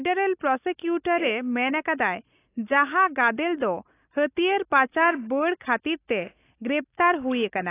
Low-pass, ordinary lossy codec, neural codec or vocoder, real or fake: 3.6 kHz; AAC, 24 kbps; none; real